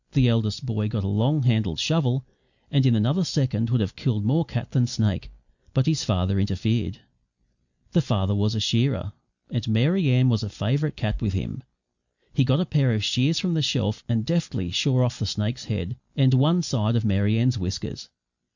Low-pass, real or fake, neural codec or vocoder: 7.2 kHz; real; none